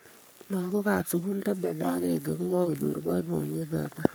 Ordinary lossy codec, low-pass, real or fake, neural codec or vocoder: none; none; fake; codec, 44.1 kHz, 3.4 kbps, Pupu-Codec